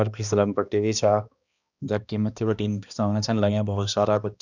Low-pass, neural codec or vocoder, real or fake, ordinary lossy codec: 7.2 kHz; codec, 16 kHz, 1 kbps, X-Codec, HuBERT features, trained on balanced general audio; fake; none